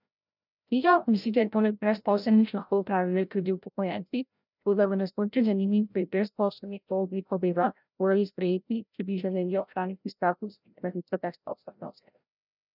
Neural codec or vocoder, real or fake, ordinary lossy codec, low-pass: codec, 16 kHz, 0.5 kbps, FreqCodec, larger model; fake; none; 5.4 kHz